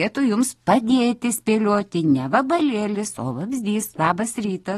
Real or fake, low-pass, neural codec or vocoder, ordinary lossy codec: real; 19.8 kHz; none; AAC, 32 kbps